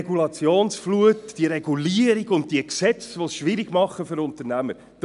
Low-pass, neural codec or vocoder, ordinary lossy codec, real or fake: 10.8 kHz; none; none; real